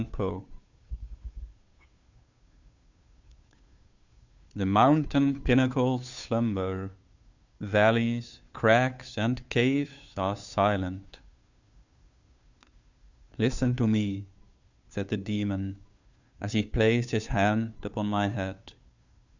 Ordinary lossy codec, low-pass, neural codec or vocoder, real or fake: Opus, 64 kbps; 7.2 kHz; codec, 16 kHz, 2 kbps, FunCodec, trained on Chinese and English, 25 frames a second; fake